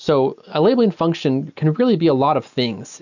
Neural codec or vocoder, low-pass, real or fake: none; 7.2 kHz; real